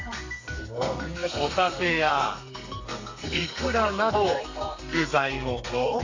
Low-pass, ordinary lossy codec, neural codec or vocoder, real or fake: 7.2 kHz; AAC, 48 kbps; codec, 32 kHz, 1.9 kbps, SNAC; fake